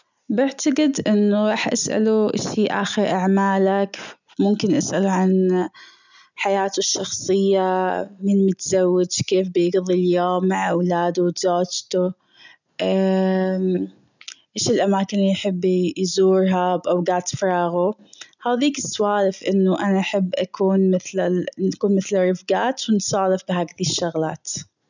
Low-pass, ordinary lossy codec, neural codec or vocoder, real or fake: 7.2 kHz; none; none; real